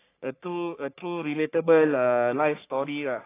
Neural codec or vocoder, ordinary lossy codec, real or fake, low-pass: codec, 44.1 kHz, 3.4 kbps, Pupu-Codec; AAC, 24 kbps; fake; 3.6 kHz